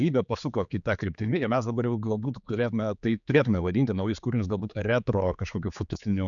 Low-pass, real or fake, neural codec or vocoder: 7.2 kHz; fake; codec, 16 kHz, 4 kbps, X-Codec, HuBERT features, trained on general audio